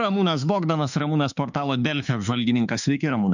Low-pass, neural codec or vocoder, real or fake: 7.2 kHz; autoencoder, 48 kHz, 32 numbers a frame, DAC-VAE, trained on Japanese speech; fake